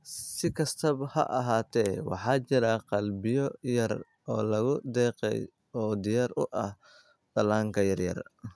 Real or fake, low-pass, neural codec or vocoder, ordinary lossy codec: real; none; none; none